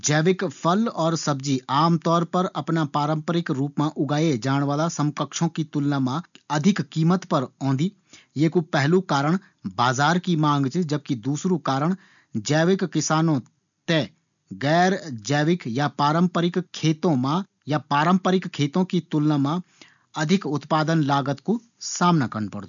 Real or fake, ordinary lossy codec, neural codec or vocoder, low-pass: real; none; none; 7.2 kHz